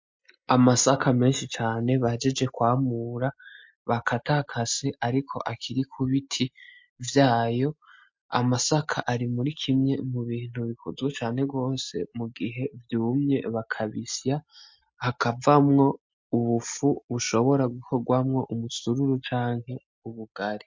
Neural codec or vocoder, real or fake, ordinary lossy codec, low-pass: none; real; MP3, 48 kbps; 7.2 kHz